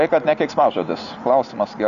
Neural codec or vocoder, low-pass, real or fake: none; 7.2 kHz; real